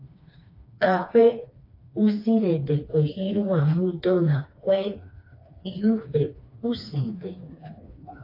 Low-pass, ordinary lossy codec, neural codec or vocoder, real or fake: 5.4 kHz; AAC, 32 kbps; codec, 16 kHz, 2 kbps, FreqCodec, smaller model; fake